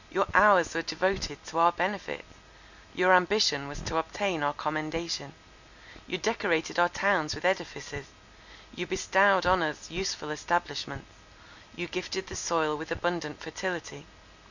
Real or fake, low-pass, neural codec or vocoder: real; 7.2 kHz; none